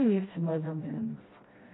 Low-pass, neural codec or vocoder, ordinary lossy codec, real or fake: 7.2 kHz; codec, 16 kHz, 1 kbps, FreqCodec, smaller model; AAC, 16 kbps; fake